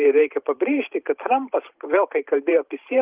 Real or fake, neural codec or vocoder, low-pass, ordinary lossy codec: fake; vocoder, 44.1 kHz, 128 mel bands every 512 samples, BigVGAN v2; 3.6 kHz; Opus, 32 kbps